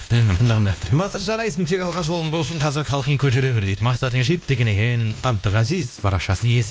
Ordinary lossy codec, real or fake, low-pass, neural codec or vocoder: none; fake; none; codec, 16 kHz, 1 kbps, X-Codec, WavLM features, trained on Multilingual LibriSpeech